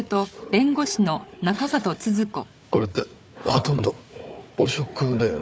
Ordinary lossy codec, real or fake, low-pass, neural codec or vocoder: none; fake; none; codec, 16 kHz, 4 kbps, FunCodec, trained on Chinese and English, 50 frames a second